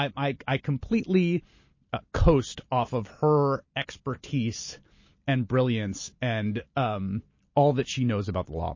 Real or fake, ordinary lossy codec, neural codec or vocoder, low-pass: real; MP3, 32 kbps; none; 7.2 kHz